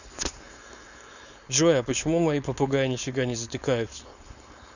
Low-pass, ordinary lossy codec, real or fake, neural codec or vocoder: 7.2 kHz; none; fake; codec, 16 kHz, 4.8 kbps, FACodec